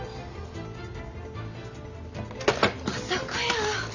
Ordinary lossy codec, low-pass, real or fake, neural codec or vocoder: none; 7.2 kHz; real; none